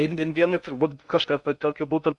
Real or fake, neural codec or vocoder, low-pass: fake; codec, 16 kHz in and 24 kHz out, 0.6 kbps, FocalCodec, streaming, 2048 codes; 10.8 kHz